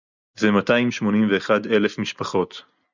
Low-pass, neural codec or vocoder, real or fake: 7.2 kHz; none; real